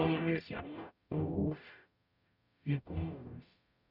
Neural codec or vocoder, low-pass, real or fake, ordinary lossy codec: codec, 44.1 kHz, 0.9 kbps, DAC; 5.4 kHz; fake; none